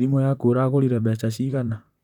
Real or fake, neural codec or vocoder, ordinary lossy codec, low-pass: fake; vocoder, 44.1 kHz, 128 mel bands, Pupu-Vocoder; none; 19.8 kHz